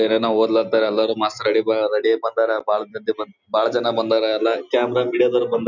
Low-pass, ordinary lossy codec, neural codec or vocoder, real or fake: 7.2 kHz; none; none; real